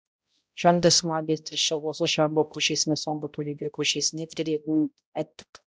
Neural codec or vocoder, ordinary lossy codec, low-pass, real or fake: codec, 16 kHz, 0.5 kbps, X-Codec, HuBERT features, trained on balanced general audio; none; none; fake